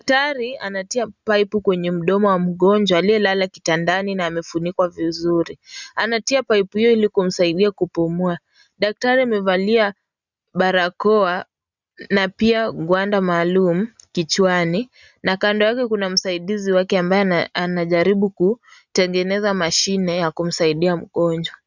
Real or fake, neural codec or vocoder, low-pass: real; none; 7.2 kHz